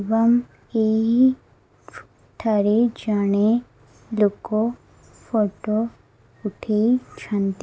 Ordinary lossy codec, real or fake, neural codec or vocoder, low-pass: none; real; none; none